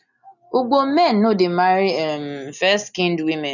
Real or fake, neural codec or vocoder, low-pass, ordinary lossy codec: real; none; 7.2 kHz; none